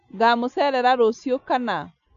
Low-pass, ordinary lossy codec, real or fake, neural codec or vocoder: 7.2 kHz; none; real; none